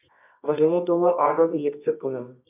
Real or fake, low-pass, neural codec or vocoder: fake; 3.6 kHz; codec, 24 kHz, 0.9 kbps, WavTokenizer, medium music audio release